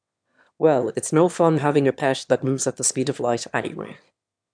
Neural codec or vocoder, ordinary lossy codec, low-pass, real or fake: autoencoder, 22.05 kHz, a latent of 192 numbers a frame, VITS, trained on one speaker; none; 9.9 kHz; fake